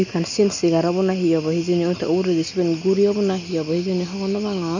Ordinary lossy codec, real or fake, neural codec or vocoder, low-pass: none; real; none; 7.2 kHz